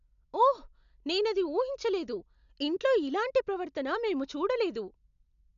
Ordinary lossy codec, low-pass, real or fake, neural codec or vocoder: none; 7.2 kHz; real; none